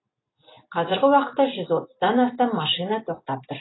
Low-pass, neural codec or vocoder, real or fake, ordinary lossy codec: 7.2 kHz; none; real; AAC, 16 kbps